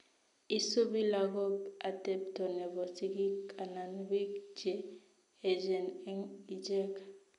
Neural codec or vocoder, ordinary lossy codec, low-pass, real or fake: none; none; 10.8 kHz; real